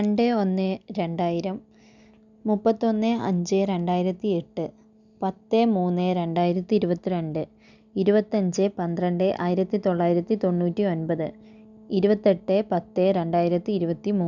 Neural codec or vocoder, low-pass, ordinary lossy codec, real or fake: none; 7.2 kHz; none; real